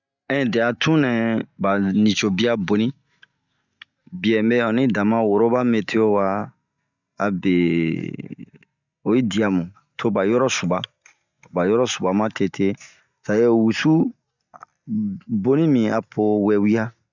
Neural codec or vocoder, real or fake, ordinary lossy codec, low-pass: none; real; none; 7.2 kHz